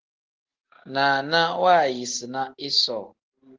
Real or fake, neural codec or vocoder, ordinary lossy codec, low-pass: real; none; Opus, 16 kbps; 7.2 kHz